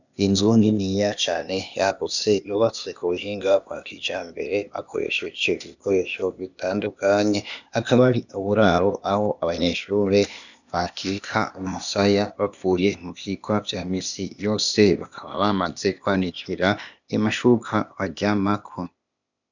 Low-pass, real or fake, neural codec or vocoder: 7.2 kHz; fake; codec, 16 kHz, 0.8 kbps, ZipCodec